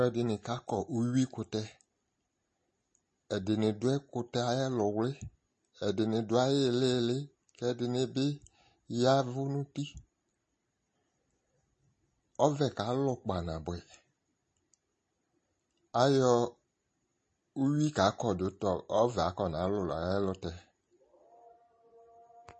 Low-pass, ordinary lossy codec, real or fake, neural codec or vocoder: 10.8 kHz; MP3, 32 kbps; real; none